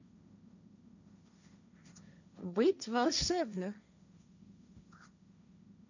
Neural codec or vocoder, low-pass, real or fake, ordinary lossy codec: codec, 16 kHz, 1.1 kbps, Voila-Tokenizer; 7.2 kHz; fake; none